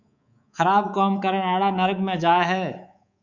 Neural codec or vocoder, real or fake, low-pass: codec, 24 kHz, 3.1 kbps, DualCodec; fake; 7.2 kHz